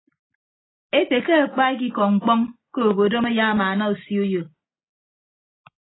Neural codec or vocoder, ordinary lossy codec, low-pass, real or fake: none; AAC, 16 kbps; 7.2 kHz; real